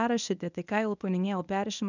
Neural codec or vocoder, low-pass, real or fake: codec, 24 kHz, 0.9 kbps, WavTokenizer, medium speech release version 1; 7.2 kHz; fake